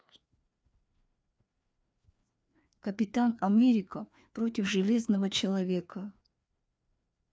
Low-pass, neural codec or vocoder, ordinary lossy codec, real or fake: none; codec, 16 kHz, 2 kbps, FreqCodec, larger model; none; fake